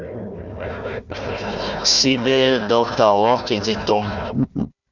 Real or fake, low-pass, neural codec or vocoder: fake; 7.2 kHz; codec, 16 kHz, 1 kbps, FunCodec, trained on Chinese and English, 50 frames a second